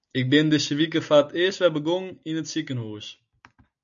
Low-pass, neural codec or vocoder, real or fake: 7.2 kHz; none; real